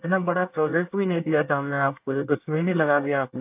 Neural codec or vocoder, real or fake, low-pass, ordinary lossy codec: codec, 24 kHz, 1 kbps, SNAC; fake; 3.6 kHz; AAC, 32 kbps